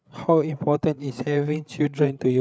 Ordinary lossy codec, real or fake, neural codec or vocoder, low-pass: none; fake; codec, 16 kHz, 8 kbps, FreqCodec, larger model; none